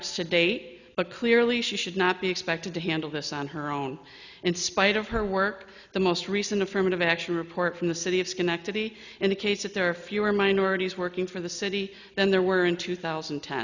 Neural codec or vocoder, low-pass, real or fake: none; 7.2 kHz; real